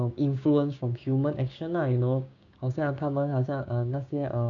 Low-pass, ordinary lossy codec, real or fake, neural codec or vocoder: 7.2 kHz; none; fake; codec, 16 kHz, 6 kbps, DAC